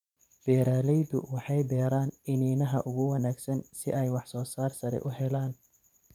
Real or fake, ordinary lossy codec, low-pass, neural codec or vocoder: fake; none; 19.8 kHz; vocoder, 44.1 kHz, 128 mel bands every 512 samples, BigVGAN v2